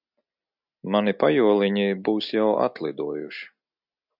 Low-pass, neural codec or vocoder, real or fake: 5.4 kHz; none; real